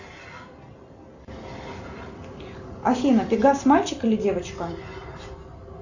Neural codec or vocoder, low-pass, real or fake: none; 7.2 kHz; real